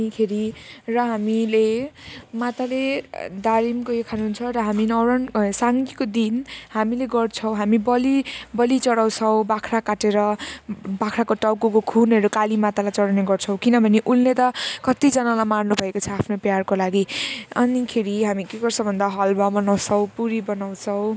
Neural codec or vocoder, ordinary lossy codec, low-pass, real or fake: none; none; none; real